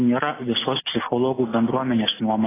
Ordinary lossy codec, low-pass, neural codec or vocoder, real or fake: AAC, 16 kbps; 3.6 kHz; none; real